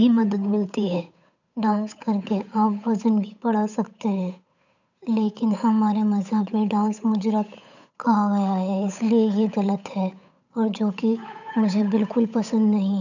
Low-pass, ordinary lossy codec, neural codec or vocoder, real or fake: 7.2 kHz; none; codec, 16 kHz, 8 kbps, FunCodec, trained on Chinese and English, 25 frames a second; fake